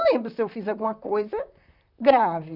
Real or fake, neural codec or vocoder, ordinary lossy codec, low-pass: fake; vocoder, 44.1 kHz, 128 mel bands, Pupu-Vocoder; none; 5.4 kHz